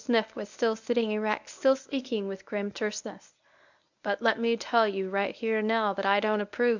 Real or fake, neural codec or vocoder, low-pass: fake; codec, 24 kHz, 0.9 kbps, WavTokenizer, medium speech release version 1; 7.2 kHz